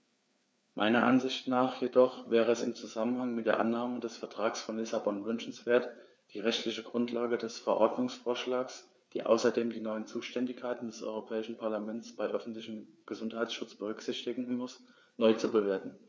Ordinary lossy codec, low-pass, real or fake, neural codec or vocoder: none; none; fake; codec, 16 kHz, 4 kbps, FreqCodec, larger model